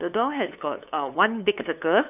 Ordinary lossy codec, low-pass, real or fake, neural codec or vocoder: none; 3.6 kHz; fake; codec, 16 kHz, 2 kbps, FunCodec, trained on LibriTTS, 25 frames a second